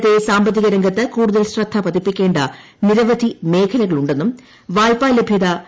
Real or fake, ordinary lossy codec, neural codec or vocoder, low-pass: real; none; none; none